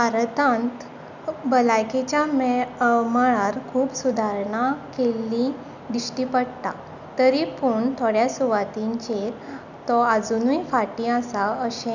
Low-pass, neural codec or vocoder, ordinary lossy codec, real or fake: 7.2 kHz; none; none; real